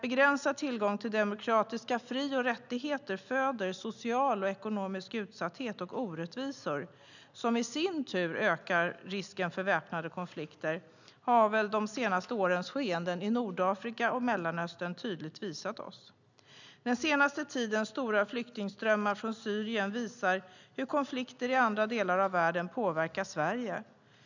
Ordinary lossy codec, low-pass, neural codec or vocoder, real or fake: none; 7.2 kHz; none; real